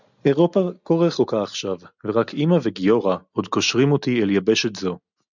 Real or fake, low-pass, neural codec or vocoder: real; 7.2 kHz; none